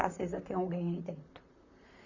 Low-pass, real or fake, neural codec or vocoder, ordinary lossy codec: 7.2 kHz; fake; vocoder, 44.1 kHz, 128 mel bands, Pupu-Vocoder; none